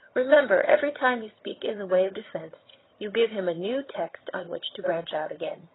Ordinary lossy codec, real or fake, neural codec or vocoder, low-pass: AAC, 16 kbps; fake; vocoder, 22.05 kHz, 80 mel bands, HiFi-GAN; 7.2 kHz